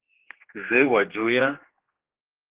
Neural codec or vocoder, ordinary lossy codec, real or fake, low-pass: codec, 16 kHz, 2 kbps, X-Codec, HuBERT features, trained on general audio; Opus, 16 kbps; fake; 3.6 kHz